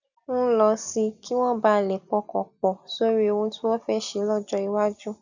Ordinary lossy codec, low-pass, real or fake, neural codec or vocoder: none; 7.2 kHz; real; none